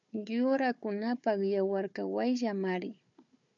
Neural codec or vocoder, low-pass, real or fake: codec, 16 kHz, 4 kbps, FunCodec, trained on Chinese and English, 50 frames a second; 7.2 kHz; fake